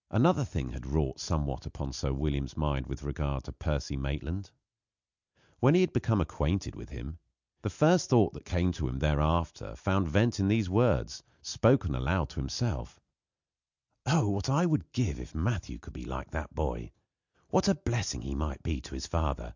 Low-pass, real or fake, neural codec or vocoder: 7.2 kHz; real; none